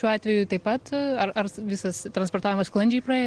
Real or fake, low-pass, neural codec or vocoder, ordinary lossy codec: real; 9.9 kHz; none; Opus, 16 kbps